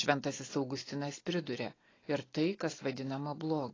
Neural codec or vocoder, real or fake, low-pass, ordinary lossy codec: none; real; 7.2 kHz; AAC, 32 kbps